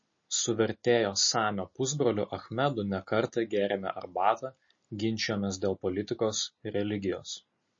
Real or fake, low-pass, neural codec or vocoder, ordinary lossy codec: real; 7.2 kHz; none; MP3, 32 kbps